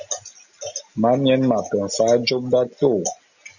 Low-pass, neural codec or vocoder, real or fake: 7.2 kHz; none; real